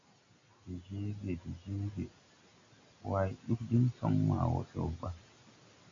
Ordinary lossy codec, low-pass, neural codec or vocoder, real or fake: AAC, 48 kbps; 7.2 kHz; none; real